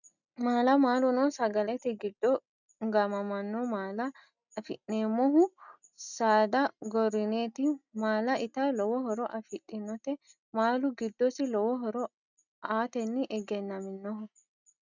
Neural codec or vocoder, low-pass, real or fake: none; 7.2 kHz; real